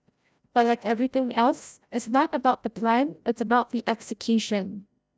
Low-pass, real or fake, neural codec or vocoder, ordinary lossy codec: none; fake; codec, 16 kHz, 0.5 kbps, FreqCodec, larger model; none